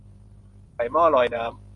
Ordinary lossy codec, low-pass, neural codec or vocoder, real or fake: MP3, 96 kbps; 10.8 kHz; vocoder, 44.1 kHz, 128 mel bands every 512 samples, BigVGAN v2; fake